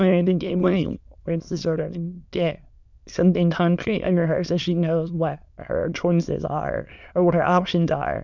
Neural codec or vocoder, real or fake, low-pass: autoencoder, 22.05 kHz, a latent of 192 numbers a frame, VITS, trained on many speakers; fake; 7.2 kHz